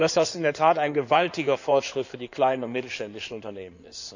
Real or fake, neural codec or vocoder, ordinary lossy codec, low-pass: fake; codec, 16 kHz in and 24 kHz out, 2.2 kbps, FireRedTTS-2 codec; none; 7.2 kHz